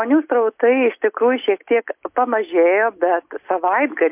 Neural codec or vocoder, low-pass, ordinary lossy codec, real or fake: none; 3.6 kHz; MP3, 32 kbps; real